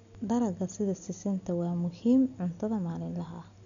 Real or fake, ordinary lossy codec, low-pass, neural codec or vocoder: real; none; 7.2 kHz; none